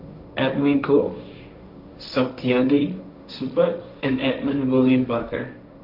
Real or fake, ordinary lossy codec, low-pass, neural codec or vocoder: fake; none; 5.4 kHz; codec, 16 kHz, 1.1 kbps, Voila-Tokenizer